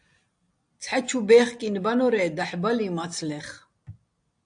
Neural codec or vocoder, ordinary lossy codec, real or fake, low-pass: none; AAC, 64 kbps; real; 9.9 kHz